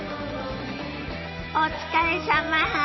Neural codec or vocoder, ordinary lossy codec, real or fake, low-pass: none; MP3, 24 kbps; real; 7.2 kHz